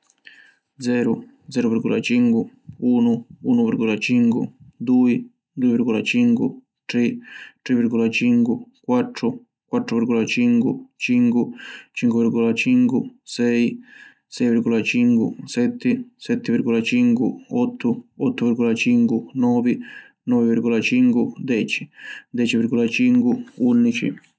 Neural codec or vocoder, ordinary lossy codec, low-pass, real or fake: none; none; none; real